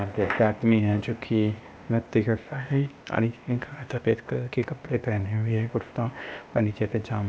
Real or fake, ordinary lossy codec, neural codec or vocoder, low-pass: fake; none; codec, 16 kHz, 0.8 kbps, ZipCodec; none